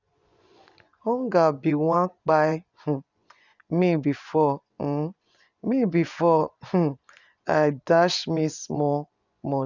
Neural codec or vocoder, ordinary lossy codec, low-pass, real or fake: vocoder, 24 kHz, 100 mel bands, Vocos; none; 7.2 kHz; fake